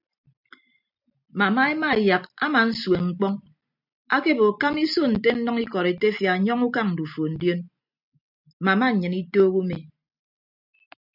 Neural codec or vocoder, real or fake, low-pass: none; real; 5.4 kHz